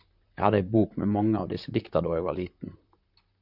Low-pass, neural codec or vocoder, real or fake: 5.4 kHz; vocoder, 44.1 kHz, 128 mel bands, Pupu-Vocoder; fake